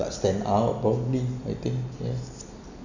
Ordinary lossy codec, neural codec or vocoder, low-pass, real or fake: none; none; 7.2 kHz; real